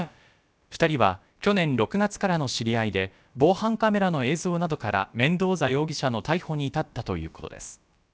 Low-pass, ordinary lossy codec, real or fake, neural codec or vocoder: none; none; fake; codec, 16 kHz, about 1 kbps, DyCAST, with the encoder's durations